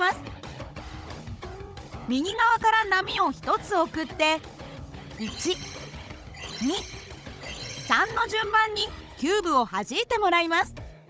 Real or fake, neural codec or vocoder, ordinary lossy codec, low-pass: fake; codec, 16 kHz, 16 kbps, FunCodec, trained on Chinese and English, 50 frames a second; none; none